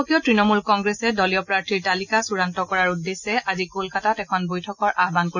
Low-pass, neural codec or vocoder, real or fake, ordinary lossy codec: 7.2 kHz; none; real; none